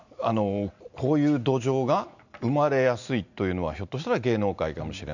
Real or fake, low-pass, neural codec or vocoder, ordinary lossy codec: real; 7.2 kHz; none; none